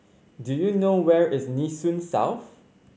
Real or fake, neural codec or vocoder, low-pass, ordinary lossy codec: real; none; none; none